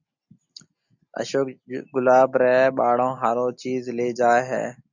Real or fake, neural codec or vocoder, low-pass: real; none; 7.2 kHz